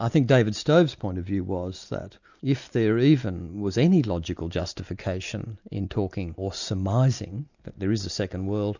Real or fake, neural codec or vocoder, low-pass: real; none; 7.2 kHz